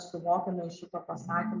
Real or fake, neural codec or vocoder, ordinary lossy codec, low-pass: real; none; MP3, 64 kbps; 7.2 kHz